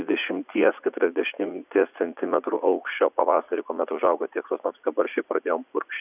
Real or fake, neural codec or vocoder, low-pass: fake; vocoder, 44.1 kHz, 80 mel bands, Vocos; 3.6 kHz